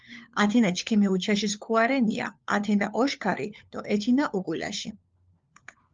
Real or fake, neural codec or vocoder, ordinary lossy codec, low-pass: fake; codec, 16 kHz, 4 kbps, FunCodec, trained on LibriTTS, 50 frames a second; Opus, 32 kbps; 7.2 kHz